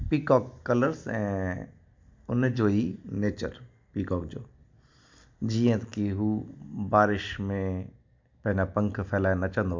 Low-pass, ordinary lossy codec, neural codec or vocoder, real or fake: 7.2 kHz; MP3, 64 kbps; none; real